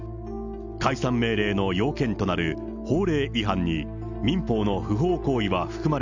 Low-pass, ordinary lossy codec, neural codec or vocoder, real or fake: 7.2 kHz; none; none; real